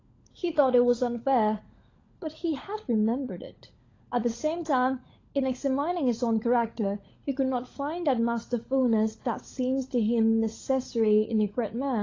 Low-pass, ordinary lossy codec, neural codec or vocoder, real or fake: 7.2 kHz; AAC, 32 kbps; codec, 16 kHz, 16 kbps, FunCodec, trained on LibriTTS, 50 frames a second; fake